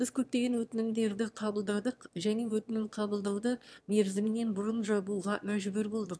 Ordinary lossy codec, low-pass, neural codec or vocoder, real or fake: none; none; autoencoder, 22.05 kHz, a latent of 192 numbers a frame, VITS, trained on one speaker; fake